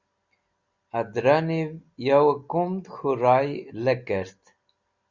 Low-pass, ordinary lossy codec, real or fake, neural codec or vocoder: 7.2 kHz; Opus, 64 kbps; real; none